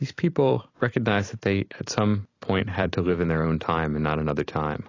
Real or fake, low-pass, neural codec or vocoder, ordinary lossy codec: fake; 7.2 kHz; vocoder, 44.1 kHz, 128 mel bands every 256 samples, BigVGAN v2; AAC, 32 kbps